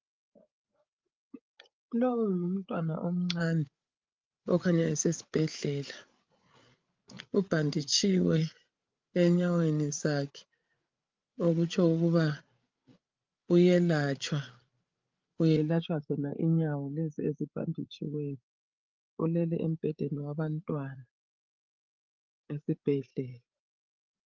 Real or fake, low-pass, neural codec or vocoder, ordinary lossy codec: fake; 7.2 kHz; codec, 16 kHz, 8 kbps, FreqCodec, larger model; Opus, 32 kbps